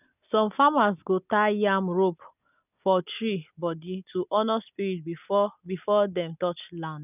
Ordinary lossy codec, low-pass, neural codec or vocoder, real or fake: none; 3.6 kHz; none; real